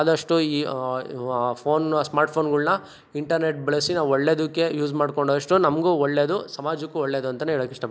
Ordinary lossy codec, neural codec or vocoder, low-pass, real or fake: none; none; none; real